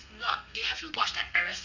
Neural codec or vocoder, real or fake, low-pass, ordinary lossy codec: codec, 32 kHz, 1.9 kbps, SNAC; fake; 7.2 kHz; none